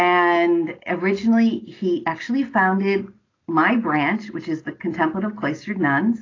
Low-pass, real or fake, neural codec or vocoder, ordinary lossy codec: 7.2 kHz; real; none; AAC, 32 kbps